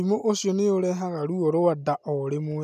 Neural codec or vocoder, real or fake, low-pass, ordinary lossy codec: none; real; 14.4 kHz; none